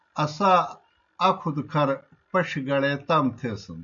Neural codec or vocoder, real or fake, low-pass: none; real; 7.2 kHz